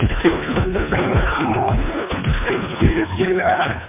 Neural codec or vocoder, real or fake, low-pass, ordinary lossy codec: codec, 24 kHz, 1.5 kbps, HILCodec; fake; 3.6 kHz; MP3, 24 kbps